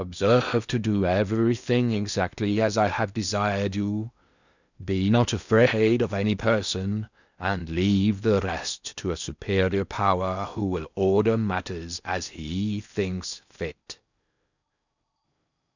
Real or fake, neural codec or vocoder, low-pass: fake; codec, 16 kHz in and 24 kHz out, 0.8 kbps, FocalCodec, streaming, 65536 codes; 7.2 kHz